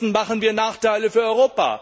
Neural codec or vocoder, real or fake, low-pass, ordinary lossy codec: none; real; none; none